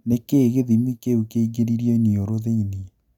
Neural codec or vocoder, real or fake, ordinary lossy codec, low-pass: none; real; none; 19.8 kHz